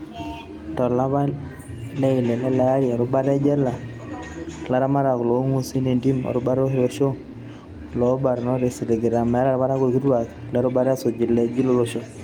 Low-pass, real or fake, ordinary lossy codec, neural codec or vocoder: 19.8 kHz; real; Opus, 32 kbps; none